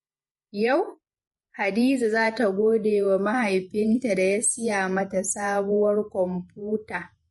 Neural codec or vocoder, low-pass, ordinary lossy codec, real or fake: vocoder, 48 kHz, 128 mel bands, Vocos; 19.8 kHz; MP3, 48 kbps; fake